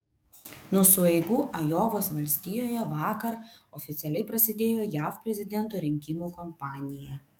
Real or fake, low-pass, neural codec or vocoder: fake; 19.8 kHz; codec, 44.1 kHz, 7.8 kbps, DAC